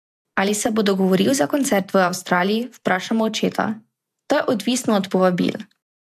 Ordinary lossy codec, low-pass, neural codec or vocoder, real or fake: MP3, 96 kbps; 14.4 kHz; vocoder, 48 kHz, 128 mel bands, Vocos; fake